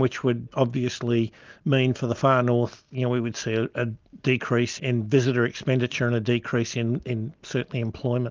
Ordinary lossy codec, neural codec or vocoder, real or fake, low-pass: Opus, 24 kbps; none; real; 7.2 kHz